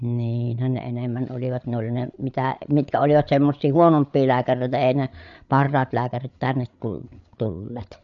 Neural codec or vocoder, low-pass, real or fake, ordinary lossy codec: codec, 16 kHz, 8 kbps, FreqCodec, larger model; 7.2 kHz; fake; none